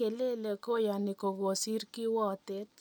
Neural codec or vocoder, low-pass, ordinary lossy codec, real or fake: none; none; none; real